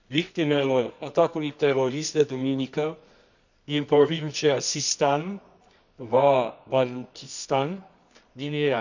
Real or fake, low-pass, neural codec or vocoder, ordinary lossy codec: fake; 7.2 kHz; codec, 24 kHz, 0.9 kbps, WavTokenizer, medium music audio release; none